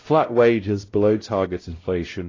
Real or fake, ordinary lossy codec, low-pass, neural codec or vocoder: fake; AAC, 32 kbps; 7.2 kHz; codec, 16 kHz, 0.5 kbps, X-Codec, WavLM features, trained on Multilingual LibriSpeech